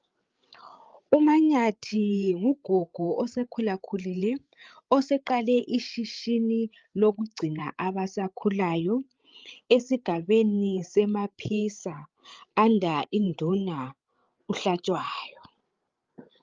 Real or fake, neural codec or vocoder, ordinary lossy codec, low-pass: fake; codec, 16 kHz, 8 kbps, FreqCodec, larger model; Opus, 32 kbps; 7.2 kHz